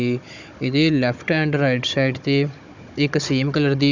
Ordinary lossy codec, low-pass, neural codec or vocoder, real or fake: none; 7.2 kHz; codec, 16 kHz, 16 kbps, FunCodec, trained on Chinese and English, 50 frames a second; fake